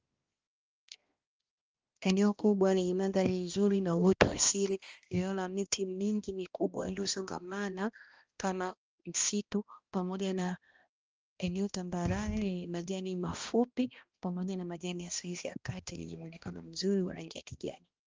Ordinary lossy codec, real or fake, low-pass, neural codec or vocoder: Opus, 24 kbps; fake; 7.2 kHz; codec, 16 kHz, 1 kbps, X-Codec, HuBERT features, trained on balanced general audio